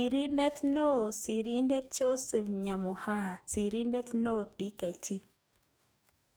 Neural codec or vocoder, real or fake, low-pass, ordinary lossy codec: codec, 44.1 kHz, 2.6 kbps, DAC; fake; none; none